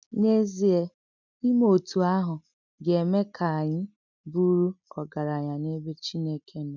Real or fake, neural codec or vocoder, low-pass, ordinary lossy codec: real; none; 7.2 kHz; none